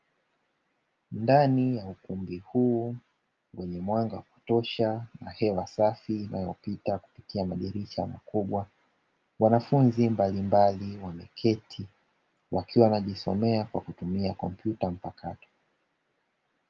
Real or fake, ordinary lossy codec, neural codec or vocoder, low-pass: real; Opus, 24 kbps; none; 7.2 kHz